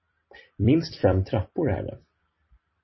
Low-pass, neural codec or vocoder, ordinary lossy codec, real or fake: 7.2 kHz; vocoder, 44.1 kHz, 128 mel bands every 256 samples, BigVGAN v2; MP3, 24 kbps; fake